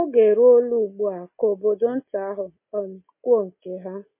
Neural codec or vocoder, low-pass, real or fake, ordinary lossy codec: none; 3.6 kHz; real; MP3, 24 kbps